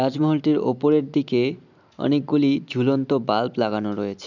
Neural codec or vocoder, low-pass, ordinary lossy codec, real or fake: none; 7.2 kHz; none; real